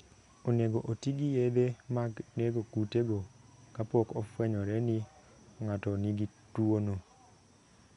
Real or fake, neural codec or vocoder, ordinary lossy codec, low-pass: real; none; none; 10.8 kHz